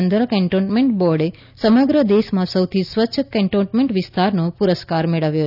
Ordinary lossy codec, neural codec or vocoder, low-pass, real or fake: none; none; 5.4 kHz; real